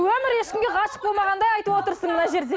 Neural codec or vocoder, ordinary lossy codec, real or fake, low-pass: none; none; real; none